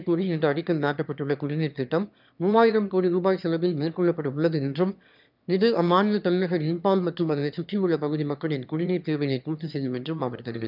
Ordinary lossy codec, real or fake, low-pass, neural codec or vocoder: none; fake; 5.4 kHz; autoencoder, 22.05 kHz, a latent of 192 numbers a frame, VITS, trained on one speaker